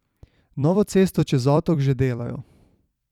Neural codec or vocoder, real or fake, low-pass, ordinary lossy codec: vocoder, 44.1 kHz, 128 mel bands every 256 samples, BigVGAN v2; fake; 19.8 kHz; none